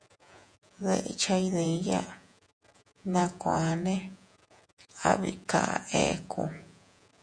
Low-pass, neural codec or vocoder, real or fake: 9.9 kHz; vocoder, 48 kHz, 128 mel bands, Vocos; fake